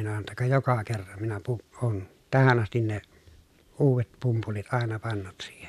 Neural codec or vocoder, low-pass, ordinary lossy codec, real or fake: none; 14.4 kHz; none; real